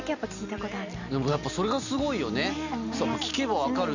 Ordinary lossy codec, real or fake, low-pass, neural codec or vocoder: none; real; 7.2 kHz; none